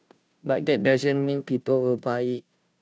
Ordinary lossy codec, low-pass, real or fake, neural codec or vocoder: none; none; fake; codec, 16 kHz, 0.5 kbps, FunCodec, trained on Chinese and English, 25 frames a second